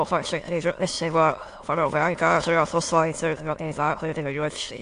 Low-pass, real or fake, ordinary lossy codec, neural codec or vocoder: 9.9 kHz; fake; AAC, 48 kbps; autoencoder, 22.05 kHz, a latent of 192 numbers a frame, VITS, trained on many speakers